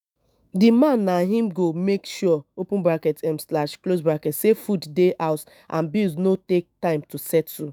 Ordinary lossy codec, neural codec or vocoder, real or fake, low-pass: none; autoencoder, 48 kHz, 128 numbers a frame, DAC-VAE, trained on Japanese speech; fake; none